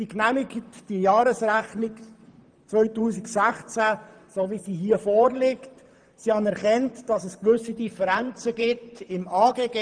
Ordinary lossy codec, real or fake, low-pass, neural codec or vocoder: Opus, 24 kbps; fake; 9.9 kHz; vocoder, 44.1 kHz, 128 mel bands, Pupu-Vocoder